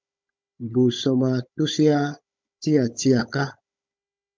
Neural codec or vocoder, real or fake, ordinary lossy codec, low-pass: codec, 16 kHz, 16 kbps, FunCodec, trained on Chinese and English, 50 frames a second; fake; MP3, 64 kbps; 7.2 kHz